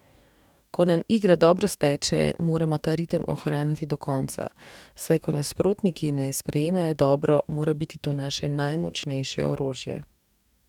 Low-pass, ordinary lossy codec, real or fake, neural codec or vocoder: 19.8 kHz; none; fake; codec, 44.1 kHz, 2.6 kbps, DAC